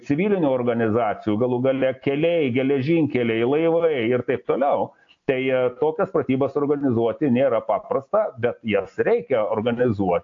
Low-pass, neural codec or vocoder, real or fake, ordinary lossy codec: 7.2 kHz; none; real; AAC, 48 kbps